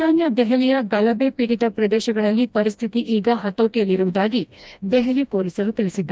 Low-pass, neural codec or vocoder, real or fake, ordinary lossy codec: none; codec, 16 kHz, 1 kbps, FreqCodec, smaller model; fake; none